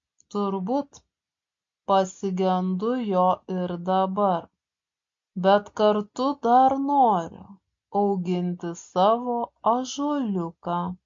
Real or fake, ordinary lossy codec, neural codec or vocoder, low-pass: real; MP3, 48 kbps; none; 7.2 kHz